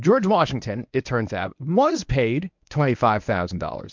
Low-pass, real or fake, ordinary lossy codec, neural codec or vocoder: 7.2 kHz; fake; MP3, 64 kbps; codec, 24 kHz, 0.9 kbps, WavTokenizer, medium speech release version 1